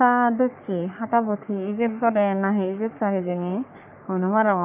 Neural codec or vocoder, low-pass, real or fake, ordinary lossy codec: codec, 44.1 kHz, 3.4 kbps, Pupu-Codec; 3.6 kHz; fake; none